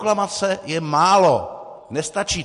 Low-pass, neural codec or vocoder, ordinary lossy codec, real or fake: 14.4 kHz; none; MP3, 48 kbps; real